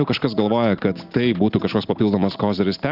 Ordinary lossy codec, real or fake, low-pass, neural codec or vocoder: Opus, 32 kbps; real; 5.4 kHz; none